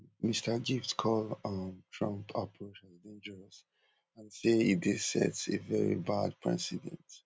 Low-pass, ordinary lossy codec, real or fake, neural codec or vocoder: none; none; real; none